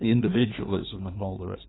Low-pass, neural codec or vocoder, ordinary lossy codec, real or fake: 7.2 kHz; codec, 16 kHz in and 24 kHz out, 1.1 kbps, FireRedTTS-2 codec; AAC, 16 kbps; fake